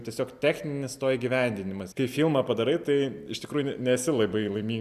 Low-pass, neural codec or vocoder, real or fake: 14.4 kHz; none; real